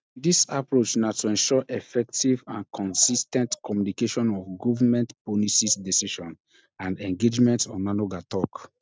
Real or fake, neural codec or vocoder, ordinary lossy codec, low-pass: real; none; none; none